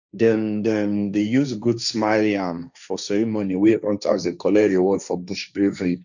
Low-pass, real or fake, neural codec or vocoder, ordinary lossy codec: 7.2 kHz; fake; codec, 16 kHz, 1.1 kbps, Voila-Tokenizer; none